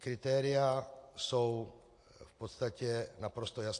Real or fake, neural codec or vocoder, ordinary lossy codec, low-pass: real; none; AAC, 48 kbps; 10.8 kHz